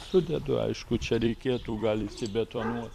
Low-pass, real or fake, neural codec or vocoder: 14.4 kHz; fake; vocoder, 44.1 kHz, 128 mel bands every 256 samples, BigVGAN v2